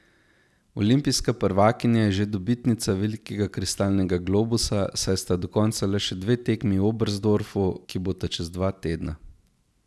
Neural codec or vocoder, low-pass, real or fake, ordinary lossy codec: none; none; real; none